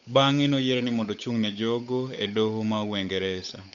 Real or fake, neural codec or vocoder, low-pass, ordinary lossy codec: fake; codec, 16 kHz, 8 kbps, FunCodec, trained on Chinese and English, 25 frames a second; 7.2 kHz; none